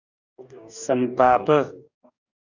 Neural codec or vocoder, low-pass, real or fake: codec, 44.1 kHz, 2.6 kbps, DAC; 7.2 kHz; fake